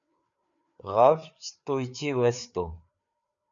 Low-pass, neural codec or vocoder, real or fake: 7.2 kHz; codec, 16 kHz, 4 kbps, FreqCodec, larger model; fake